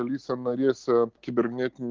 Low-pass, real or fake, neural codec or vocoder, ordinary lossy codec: 7.2 kHz; real; none; Opus, 16 kbps